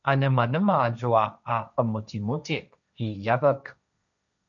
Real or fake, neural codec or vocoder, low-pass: fake; codec, 16 kHz, 1.1 kbps, Voila-Tokenizer; 7.2 kHz